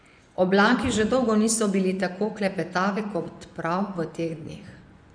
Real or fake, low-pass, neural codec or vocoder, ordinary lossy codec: fake; 9.9 kHz; vocoder, 24 kHz, 100 mel bands, Vocos; none